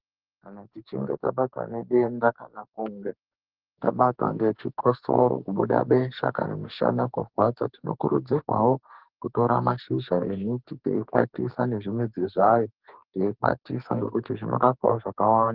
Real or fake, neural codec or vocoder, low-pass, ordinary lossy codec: fake; codec, 44.1 kHz, 2.6 kbps, SNAC; 5.4 kHz; Opus, 16 kbps